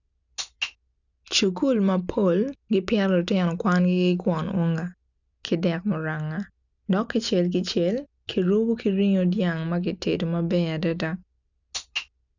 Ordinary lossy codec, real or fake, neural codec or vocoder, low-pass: none; real; none; 7.2 kHz